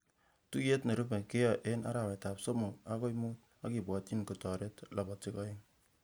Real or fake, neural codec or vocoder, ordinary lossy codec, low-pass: real; none; none; none